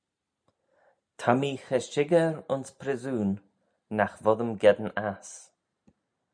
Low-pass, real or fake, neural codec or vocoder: 9.9 kHz; real; none